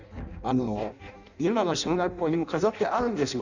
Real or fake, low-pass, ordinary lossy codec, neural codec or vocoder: fake; 7.2 kHz; none; codec, 16 kHz in and 24 kHz out, 0.6 kbps, FireRedTTS-2 codec